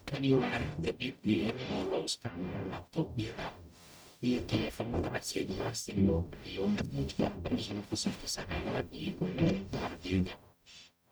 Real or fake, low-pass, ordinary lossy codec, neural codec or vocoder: fake; none; none; codec, 44.1 kHz, 0.9 kbps, DAC